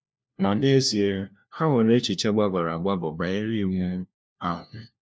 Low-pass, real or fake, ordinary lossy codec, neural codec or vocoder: none; fake; none; codec, 16 kHz, 1 kbps, FunCodec, trained on LibriTTS, 50 frames a second